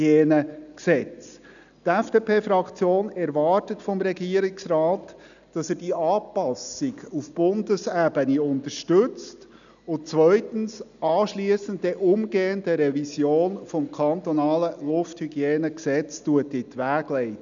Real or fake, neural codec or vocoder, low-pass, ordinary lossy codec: real; none; 7.2 kHz; none